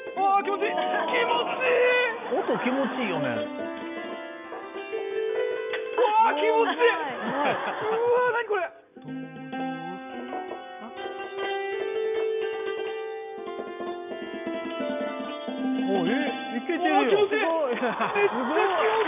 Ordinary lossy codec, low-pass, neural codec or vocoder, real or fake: none; 3.6 kHz; none; real